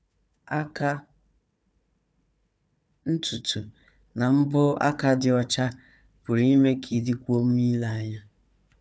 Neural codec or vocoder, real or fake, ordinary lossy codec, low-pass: codec, 16 kHz, 4 kbps, FunCodec, trained on Chinese and English, 50 frames a second; fake; none; none